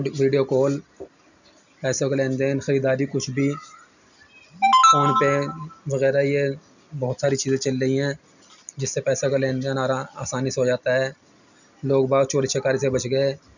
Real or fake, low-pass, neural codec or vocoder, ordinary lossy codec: real; 7.2 kHz; none; none